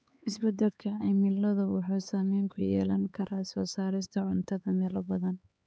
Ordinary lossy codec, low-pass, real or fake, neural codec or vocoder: none; none; fake; codec, 16 kHz, 4 kbps, X-Codec, HuBERT features, trained on LibriSpeech